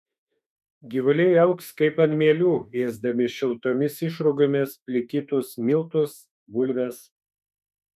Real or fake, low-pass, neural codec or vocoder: fake; 14.4 kHz; autoencoder, 48 kHz, 32 numbers a frame, DAC-VAE, trained on Japanese speech